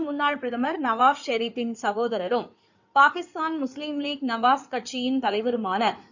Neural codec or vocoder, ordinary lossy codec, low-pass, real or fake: codec, 16 kHz in and 24 kHz out, 2.2 kbps, FireRedTTS-2 codec; none; 7.2 kHz; fake